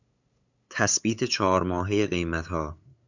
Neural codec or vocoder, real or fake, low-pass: codec, 16 kHz, 8 kbps, FunCodec, trained on LibriTTS, 25 frames a second; fake; 7.2 kHz